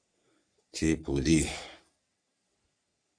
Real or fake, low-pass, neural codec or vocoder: fake; 9.9 kHz; codec, 44.1 kHz, 3.4 kbps, Pupu-Codec